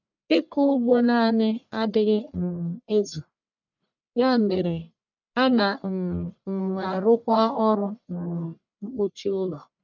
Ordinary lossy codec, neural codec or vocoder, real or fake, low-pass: none; codec, 44.1 kHz, 1.7 kbps, Pupu-Codec; fake; 7.2 kHz